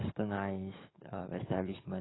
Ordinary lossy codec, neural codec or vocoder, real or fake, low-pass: AAC, 16 kbps; codec, 44.1 kHz, 7.8 kbps, DAC; fake; 7.2 kHz